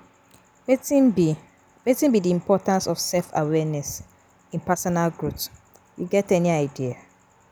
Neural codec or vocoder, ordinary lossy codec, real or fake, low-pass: none; none; real; none